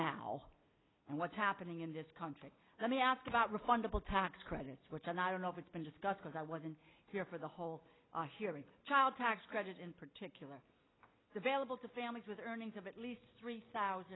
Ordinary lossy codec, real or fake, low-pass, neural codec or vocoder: AAC, 16 kbps; real; 7.2 kHz; none